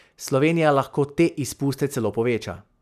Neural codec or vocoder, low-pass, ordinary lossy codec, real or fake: none; 14.4 kHz; none; real